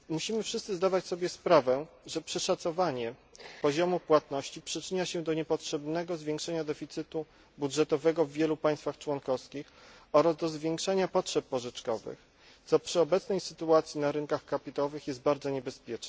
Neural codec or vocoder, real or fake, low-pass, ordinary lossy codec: none; real; none; none